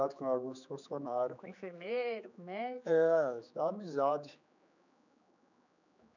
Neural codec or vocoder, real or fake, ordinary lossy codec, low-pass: codec, 16 kHz, 4 kbps, X-Codec, HuBERT features, trained on general audio; fake; none; 7.2 kHz